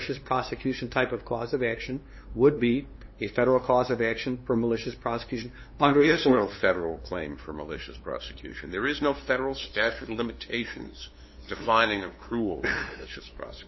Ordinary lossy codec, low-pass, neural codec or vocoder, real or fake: MP3, 24 kbps; 7.2 kHz; codec, 16 kHz, 2 kbps, FunCodec, trained on LibriTTS, 25 frames a second; fake